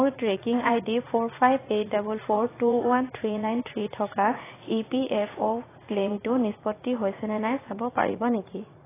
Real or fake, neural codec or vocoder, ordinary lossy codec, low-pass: fake; vocoder, 44.1 kHz, 80 mel bands, Vocos; AAC, 16 kbps; 3.6 kHz